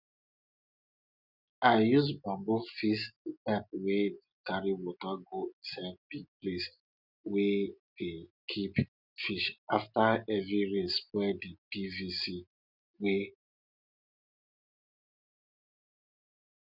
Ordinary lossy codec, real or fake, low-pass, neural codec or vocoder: none; real; 5.4 kHz; none